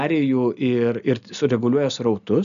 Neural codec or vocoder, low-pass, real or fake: none; 7.2 kHz; real